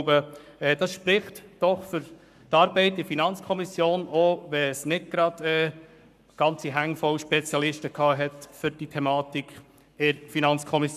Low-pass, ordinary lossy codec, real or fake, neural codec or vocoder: 14.4 kHz; none; fake; codec, 44.1 kHz, 7.8 kbps, Pupu-Codec